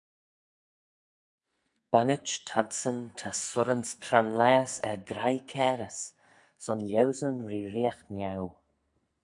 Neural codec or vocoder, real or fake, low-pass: codec, 44.1 kHz, 2.6 kbps, SNAC; fake; 10.8 kHz